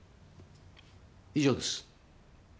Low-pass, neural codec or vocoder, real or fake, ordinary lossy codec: none; none; real; none